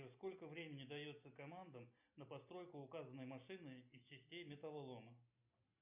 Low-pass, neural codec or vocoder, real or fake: 3.6 kHz; none; real